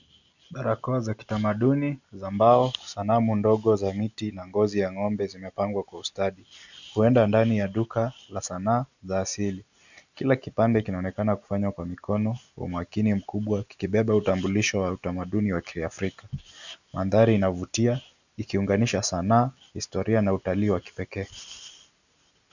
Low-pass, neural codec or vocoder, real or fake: 7.2 kHz; none; real